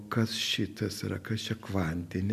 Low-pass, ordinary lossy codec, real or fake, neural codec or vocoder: 14.4 kHz; MP3, 96 kbps; real; none